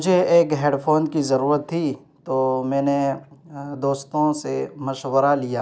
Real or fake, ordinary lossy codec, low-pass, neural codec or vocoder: real; none; none; none